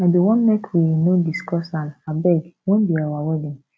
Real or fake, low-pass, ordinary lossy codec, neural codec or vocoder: real; none; none; none